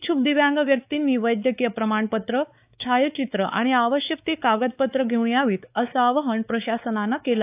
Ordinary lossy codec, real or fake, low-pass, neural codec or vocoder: AAC, 32 kbps; fake; 3.6 kHz; codec, 16 kHz, 4 kbps, X-Codec, WavLM features, trained on Multilingual LibriSpeech